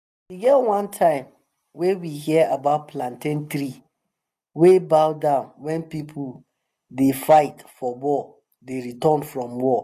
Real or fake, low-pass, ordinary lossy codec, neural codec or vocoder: real; 14.4 kHz; none; none